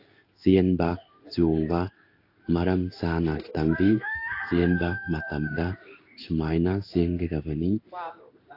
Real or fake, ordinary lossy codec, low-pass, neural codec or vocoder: fake; AAC, 32 kbps; 5.4 kHz; codec, 16 kHz in and 24 kHz out, 1 kbps, XY-Tokenizer